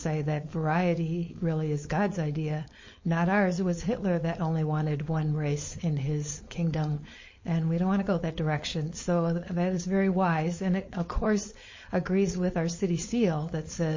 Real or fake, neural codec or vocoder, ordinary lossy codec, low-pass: fake; codec, 16 kHz, 4.8 kbps, FACodec; MP3, 32 kbps; 7.2 kHz